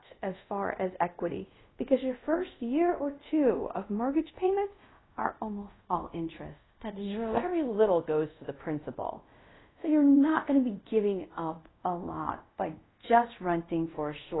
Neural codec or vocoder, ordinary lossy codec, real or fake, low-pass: codec, 24 kHz, 0.5 kbps, DualCodec; AAC, 16 kbps; fake; 7.2 kHz